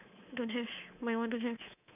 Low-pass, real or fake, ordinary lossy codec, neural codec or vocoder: 3.6 kHz; fake; none; codec, 24 kHz, 3.1 kbps, DualCodec